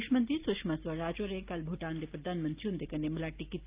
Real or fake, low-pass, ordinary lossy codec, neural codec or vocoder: real; 3.6 kHz; Opus, 32 kbps; none